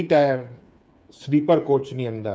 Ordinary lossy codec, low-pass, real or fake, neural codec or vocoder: none; none; fake; codec, 16 kHz, 8 kbps, FreqCodec, smaller model